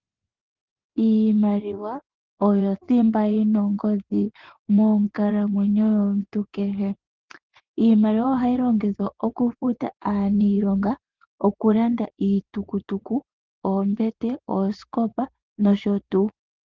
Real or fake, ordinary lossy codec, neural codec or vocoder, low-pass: fake; Opus, 16 kbps; vocoder, 24 kHz, 100 mel bands, Vocos; 7.2 kHz